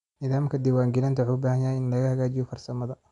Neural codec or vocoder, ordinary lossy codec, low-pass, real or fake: none; none; 10.8 kHz; real